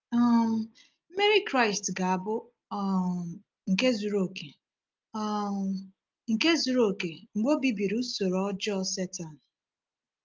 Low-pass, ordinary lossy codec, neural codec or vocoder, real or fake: 7.2 kHz; Opus, 24 kbps; none; real